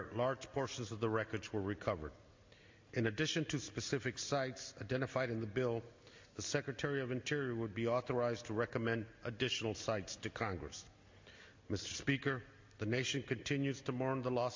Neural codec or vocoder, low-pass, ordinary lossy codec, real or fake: none; 7.2 kHz; MP3, 48 kbps; real